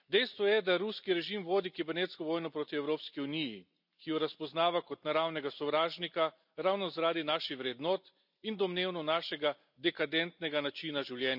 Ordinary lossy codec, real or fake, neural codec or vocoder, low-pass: none; real; none; 5.4 kHz